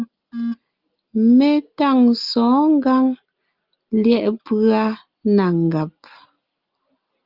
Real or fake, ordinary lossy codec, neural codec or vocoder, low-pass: real; Opus, 32 kbps; none; 5.4 kHz